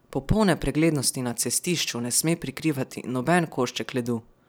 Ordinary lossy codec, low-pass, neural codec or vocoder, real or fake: none; none; none; real